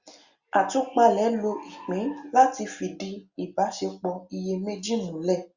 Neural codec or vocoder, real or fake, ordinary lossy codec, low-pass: none; real; Opus, 64 kbps; 7.2 kHz